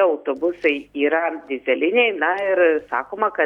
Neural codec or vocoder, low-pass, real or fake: none; 19.8 kHz; real